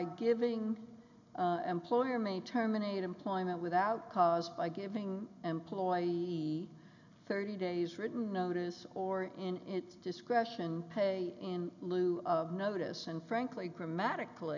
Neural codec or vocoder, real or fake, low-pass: none; real; 7.2 kHz